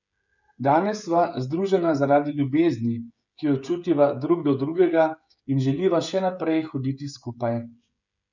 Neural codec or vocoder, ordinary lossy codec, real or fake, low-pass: codec, 16 kHz, 16 kbps, FreqCodec, smaller model; none; fake; 7.2 kHz